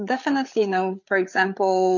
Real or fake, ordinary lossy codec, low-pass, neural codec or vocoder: fake; MP3, 48 kbps; 7.2 kHz; codec, 16 kHz, 8 kbps, FreqCodec, larger model